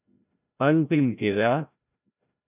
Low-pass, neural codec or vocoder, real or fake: 3.6 kHz; codec, 16 kHz, 0.5 kbps, FreqCodec, larger model; fake